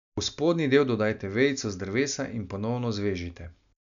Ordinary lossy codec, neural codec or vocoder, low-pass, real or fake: none; none; 7.2 kHz; real